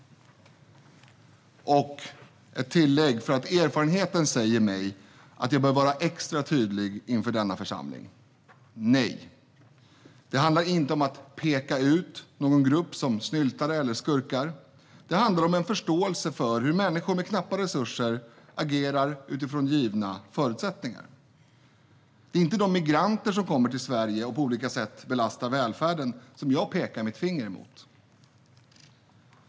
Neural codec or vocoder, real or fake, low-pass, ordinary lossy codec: none; real; none; none